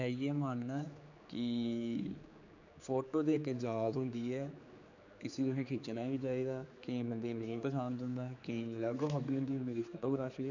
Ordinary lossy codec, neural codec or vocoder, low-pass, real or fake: none; codec, 16 kHz, 4 kbps, X-Codec, HuBERT features, trained on general audio; 7.2 kHz; fake